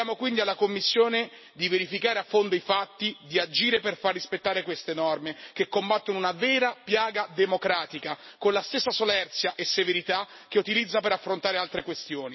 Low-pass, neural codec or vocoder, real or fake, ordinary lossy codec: 7.2 kHz; none; real; MP3, 24 kbps